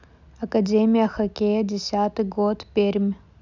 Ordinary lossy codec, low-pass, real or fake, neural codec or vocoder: none; 7.2 kHz; real; none